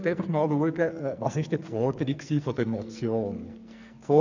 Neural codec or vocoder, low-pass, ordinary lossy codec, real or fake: codec, 44.1 kHz, 2.6 kbps, SNAC; 7.2 kHz; none; fake